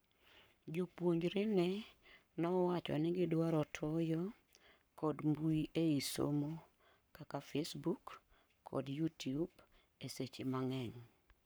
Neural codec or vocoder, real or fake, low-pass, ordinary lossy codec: codec, 44.1 kHz, 7.8 kbps, Pupu-Codec; fake; none; none